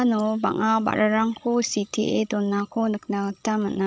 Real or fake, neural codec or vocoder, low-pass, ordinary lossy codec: fake; codec, 16 kHz, 16 kbps, FreqCodec, larger model; none; none